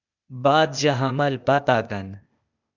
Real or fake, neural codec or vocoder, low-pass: fake; codec, 16 kHz, 0.8 kbps, ZipCodec; 7.2 kHz